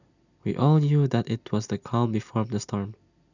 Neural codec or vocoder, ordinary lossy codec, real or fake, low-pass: none; none; real; 7.2 kHz